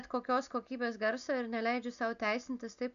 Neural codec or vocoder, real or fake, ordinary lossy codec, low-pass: none; real; AAC, 96 kbps; 7.2 kHz